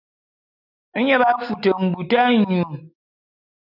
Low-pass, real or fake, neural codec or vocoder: 5.4 kHz; real; none